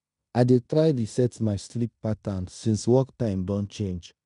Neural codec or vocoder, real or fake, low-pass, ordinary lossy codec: codec, 16 kHz in and 24 kHz out, 0.9 kbps, LongCat-Audio-Codec, fine tuned four codebook decoder; fake; 10.8 kHz; none